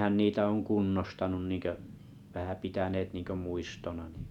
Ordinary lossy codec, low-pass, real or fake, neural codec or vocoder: none; 19.8 kHz; real; none